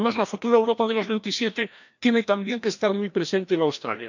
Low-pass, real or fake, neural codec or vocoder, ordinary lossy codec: 7.2 kHz; fake; codec, 16 kHz, 1 kbps, FreqCodec, larger model; none